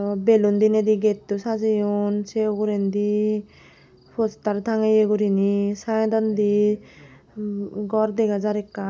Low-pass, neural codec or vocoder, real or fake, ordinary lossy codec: none; none; real; none